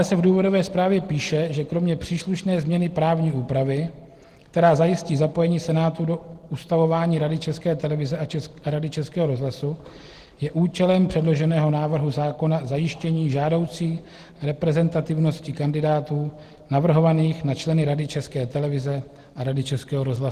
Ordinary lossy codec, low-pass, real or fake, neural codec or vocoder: Opus, 16 kbps; 14.4 kHz; real; none